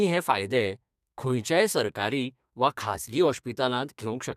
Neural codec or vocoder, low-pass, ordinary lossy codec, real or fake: codec, 32 kHz, 1.9 kbps, SNAC; 14.4 kHz; none; fake